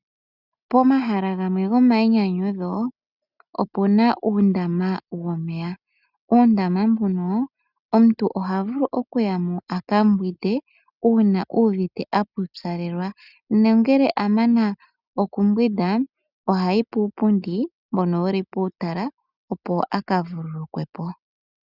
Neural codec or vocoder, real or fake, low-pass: none; real; 5.4 kHz